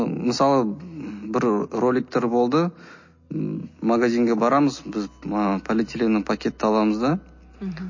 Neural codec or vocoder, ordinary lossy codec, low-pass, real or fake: none; MP3, 32 kbps; 7.2 kHz; real